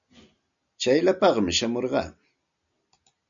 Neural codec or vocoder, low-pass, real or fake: none; 7.2 kHz; real